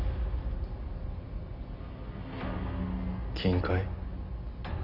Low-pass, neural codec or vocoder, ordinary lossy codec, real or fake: 5.4 kHz; none; none; real